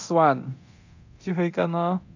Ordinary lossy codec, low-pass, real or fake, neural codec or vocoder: AAC, 48 kbps; 7.2 kHz; fake; codec, 24 kHz, 0.9 kbps, DualCodec